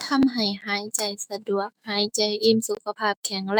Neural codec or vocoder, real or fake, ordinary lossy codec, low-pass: autoencoder, 48 kHz, 128 numbers a frame, DAC-VAE, trained on Japanese speech; fake; none; none